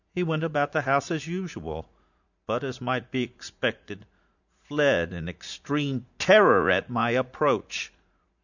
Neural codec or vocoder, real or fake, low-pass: vocoder, 44.1 kHz, 80 mel bands, Vocos; fake; 7.2 kHz